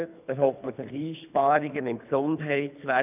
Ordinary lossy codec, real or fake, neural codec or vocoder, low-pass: none; fake; codec, 24 kHz, 3 kbps, HILCodec; 3.6 kHz